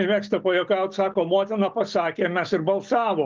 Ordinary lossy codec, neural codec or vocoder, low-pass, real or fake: Opus, 32 kbps; none; 7.2 kHz; real